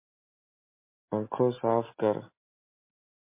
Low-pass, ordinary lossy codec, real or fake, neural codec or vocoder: 3.6 kHz; MP3, 24 kbps; real; none